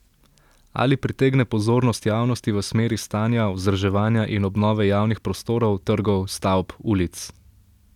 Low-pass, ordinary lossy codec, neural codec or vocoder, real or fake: 19.8 kHz; none; none; real